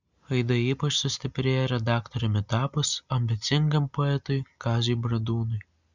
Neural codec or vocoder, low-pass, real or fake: none; 7.2 kHz; real